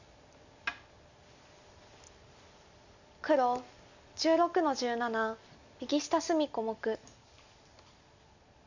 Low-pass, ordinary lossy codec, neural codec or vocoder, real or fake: 7.2 kHz; none; none; real